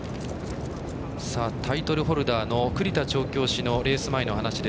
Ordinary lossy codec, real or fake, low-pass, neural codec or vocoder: none; real; none; none